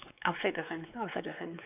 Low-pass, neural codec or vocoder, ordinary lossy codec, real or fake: 3.6 kHz; codec, 16 kHz, 4 kbps, X-Codec, WavLM features, trained on Multilingual LibriSpeech; none; fake